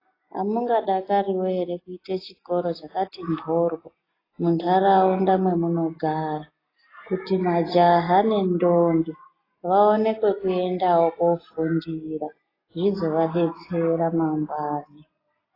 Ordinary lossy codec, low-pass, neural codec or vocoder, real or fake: AAC, 24 kbps; 5.4 kHz; none; real